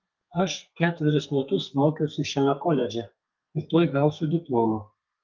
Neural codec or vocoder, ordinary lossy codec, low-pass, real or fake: codec, 32 kHz, 1.9 kbps, SNAC; Opus, 32 kbps; 7.2 kHz; fake